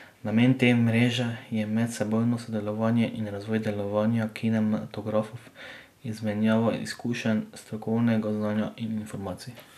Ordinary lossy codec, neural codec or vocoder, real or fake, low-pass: none; none; real; 14.4 kHz